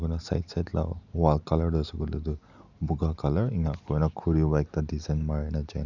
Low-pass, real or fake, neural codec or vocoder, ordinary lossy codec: 7.2 kHz; real; none; none